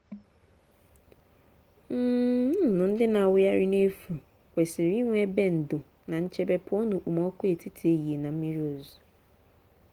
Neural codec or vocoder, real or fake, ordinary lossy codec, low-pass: none; real; Opus, 24 kbps; 19.8 kHz